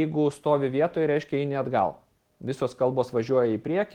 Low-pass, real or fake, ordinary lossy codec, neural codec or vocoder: 19.8 kHz; real; Opus, 16 kbps; none